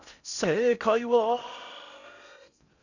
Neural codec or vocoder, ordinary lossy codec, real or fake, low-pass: codec, 16 kHz in and 24 kHz out, 0.6 kbps, FocalCodec, streaming, 4096 codes; none; fake; 7.2 kHz